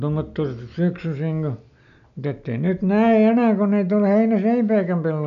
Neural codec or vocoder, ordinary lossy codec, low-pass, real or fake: none; none; 7.2 kHz; real